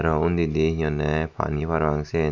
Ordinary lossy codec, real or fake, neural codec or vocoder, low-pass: none; real; none; 7.2 kHz